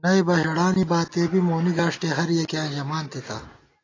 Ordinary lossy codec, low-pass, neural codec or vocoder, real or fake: AAC, 32 kbps; 7.2 kHz; none; real